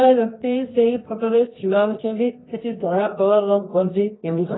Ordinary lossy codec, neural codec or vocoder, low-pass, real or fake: AAC, 16 kbps; codec, 24 kHz, 0.9 kbps, WavTokenizer, medium music audio release; 7.2 kHz; fake